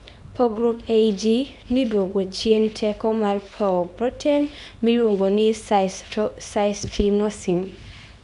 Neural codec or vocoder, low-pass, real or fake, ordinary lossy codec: codec, 24 kHz, 0.9 kbps, WavTokenizer, small release; 10.8 kHz; fake; none